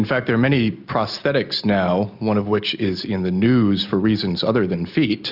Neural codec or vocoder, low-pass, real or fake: none; 5.4 kHz; real